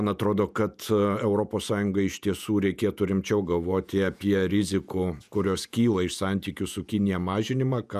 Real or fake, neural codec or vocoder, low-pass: fake; vocoder, 48 kHz, 128 mel bands, Vocos; 14.4 kHz